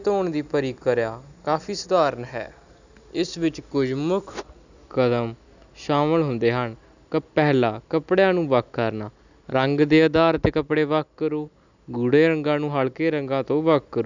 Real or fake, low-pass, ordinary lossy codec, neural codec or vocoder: real; 7.2 kHz; none; none